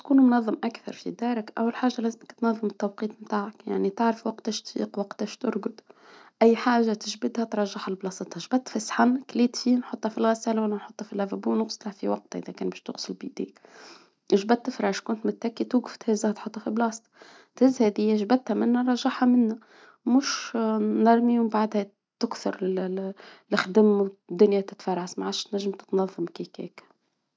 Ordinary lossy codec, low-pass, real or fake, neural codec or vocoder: none; none; real; none